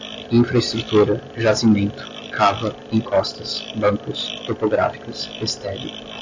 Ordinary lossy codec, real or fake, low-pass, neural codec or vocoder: AAC, 48 kbps; real; 7.2 kHz; none